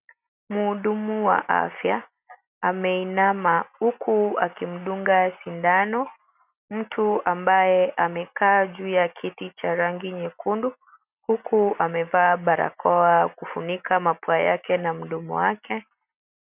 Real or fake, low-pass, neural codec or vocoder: real; 3.6 kHz; none